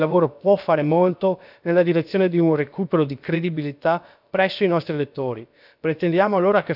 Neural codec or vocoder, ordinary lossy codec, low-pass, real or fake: codec, 16 kHz, about 1 kbps, DyCAST, with the encoder's durations; none; 5.4 kHz; fake